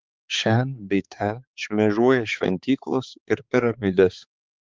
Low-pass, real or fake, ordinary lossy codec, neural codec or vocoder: 7.2 kHz; fake; Opus, 32 kbps; codec, 16 kHz, 4 kbps, X-Codec, HuBERT features, trained on balanced general audio